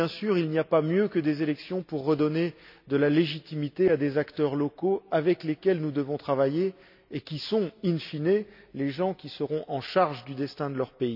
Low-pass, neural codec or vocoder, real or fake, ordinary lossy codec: 5.4 kHz; none; real; none